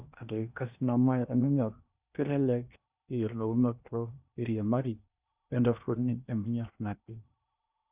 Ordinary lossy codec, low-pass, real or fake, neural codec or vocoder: Opus, 64 kbps; 3.6 kHz; fake; codec, 16 kHz in and 24 kHz out, 0.8 kbps, FocalCodec, streaming, 65536 codes